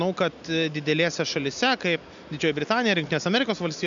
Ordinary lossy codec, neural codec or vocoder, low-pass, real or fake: MP3, 64 kbps; none; 7.2 kHz; real